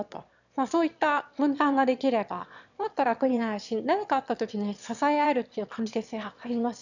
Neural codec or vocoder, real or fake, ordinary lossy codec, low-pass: autoencoder, 22.05 kHz, a latent of 192 numbers a frame, VITS, trained on one speaker; fake; none; 7.2 kHz